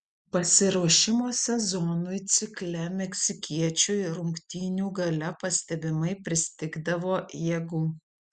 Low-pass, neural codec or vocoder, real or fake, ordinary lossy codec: 9.9 kHz; none; real; Opus, 64 kbps